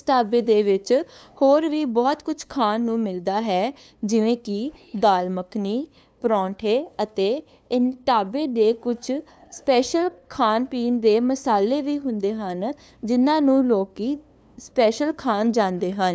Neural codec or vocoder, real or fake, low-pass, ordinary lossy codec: codec, 16 kHz, 2 kbps, FunCodec, trained on LibriTTS, 25 frames a second; fake; none; none